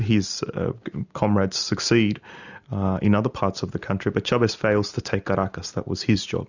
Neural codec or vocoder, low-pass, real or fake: none; 7.2 kHz; real